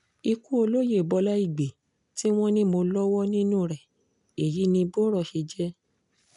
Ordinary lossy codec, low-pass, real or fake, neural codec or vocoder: none; 10.8 kHz; real; none